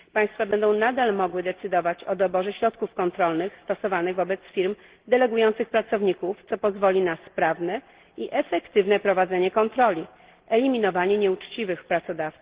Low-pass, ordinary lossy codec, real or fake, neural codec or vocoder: 3.6 kHz; Opus, 16 kbps; real; none